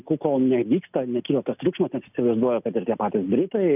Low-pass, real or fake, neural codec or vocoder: 3.6 kHz; real; none